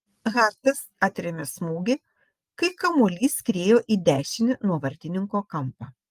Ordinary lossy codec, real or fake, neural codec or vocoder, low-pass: Opus, 24 kbps; real; none; 14.4 kHz